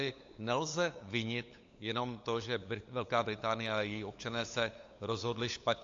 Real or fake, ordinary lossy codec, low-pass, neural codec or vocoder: fake; AAC, 48 kbps; 7.2 kHz; codec, 16 kHz, 8 kbps, FunCodec, trained on LibriTTS, 25 frames a second